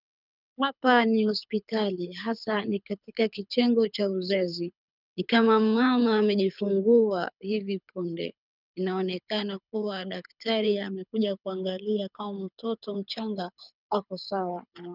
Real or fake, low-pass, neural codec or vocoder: fake; 5.4 kHz; codec, 24 kHz, 6 kbps, HILCodec